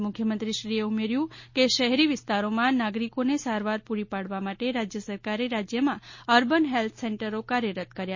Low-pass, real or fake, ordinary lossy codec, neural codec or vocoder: 7.2 kHz; real; MP3, 32 kbps; none